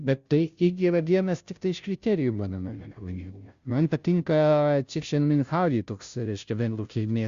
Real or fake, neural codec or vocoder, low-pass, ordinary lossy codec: fake; codec, 16 kHz, 0.5 kbps, FunCodec, trained on Chinese and English, 25 frames a second; 7.2 kHz; Opus, 64 kbps